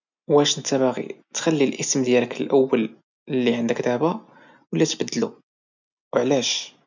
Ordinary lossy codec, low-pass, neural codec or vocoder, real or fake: none; 7.2 kHz; none; real